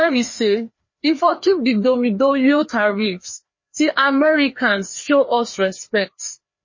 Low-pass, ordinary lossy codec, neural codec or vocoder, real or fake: 7.2 kHz; MP3, 32 kbps; codec, 16 kHz, 2 kbps, FreqCodec, larger model; fake